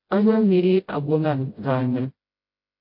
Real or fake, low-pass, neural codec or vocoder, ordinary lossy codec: fake; 5.4 kHz; codec, 16 kHz, 0.5 kbps, FreqCodec, smaller model; MP3, 32 kbps